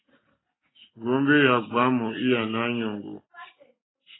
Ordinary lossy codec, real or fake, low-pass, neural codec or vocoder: AAC, 16 kbps; real; 7.2 kHz; none